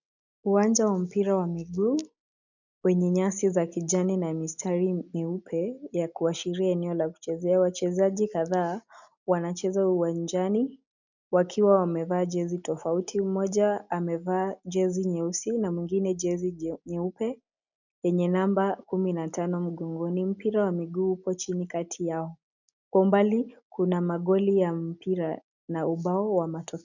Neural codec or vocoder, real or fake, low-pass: none; real; 7.2 kHz